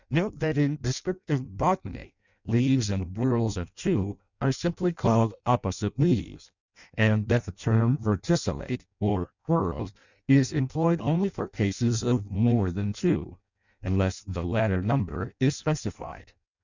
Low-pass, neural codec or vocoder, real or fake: 7.2 kHz; codec, 16 kHz in and 24 kHz out, 0.6 kbps, FireRedTTS-2 codec; fake